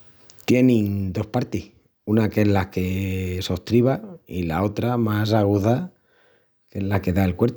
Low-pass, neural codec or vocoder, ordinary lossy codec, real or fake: none; none; none; real